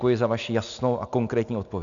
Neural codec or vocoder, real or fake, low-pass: none; real; 7.2 kHz